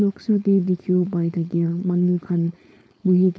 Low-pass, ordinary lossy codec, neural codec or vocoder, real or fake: none; none; codec, 16 kHz, 4.8 kbps, FACodec; fake